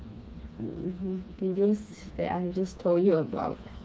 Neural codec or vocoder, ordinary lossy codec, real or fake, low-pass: codec, 16 kHz, 2 kbps, FreqCodec, smaller model; none; fake; none